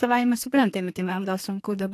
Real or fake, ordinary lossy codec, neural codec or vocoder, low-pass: fake; AAC, 64 kbps; codec, 32 kHz, 1.9 kbps, SNAC; 14.4 kHz